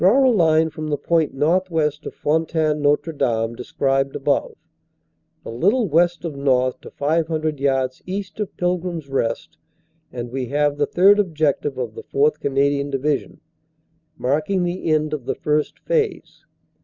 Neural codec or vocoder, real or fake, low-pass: none; real; 7.2 kHz